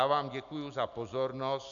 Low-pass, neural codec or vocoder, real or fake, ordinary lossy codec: 7.2 kHz; none; real; AAC, 64 kbps